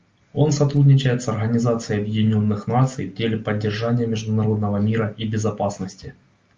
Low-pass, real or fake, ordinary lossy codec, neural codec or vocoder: 7.2 kHz; real; Opus, 32 kbps; none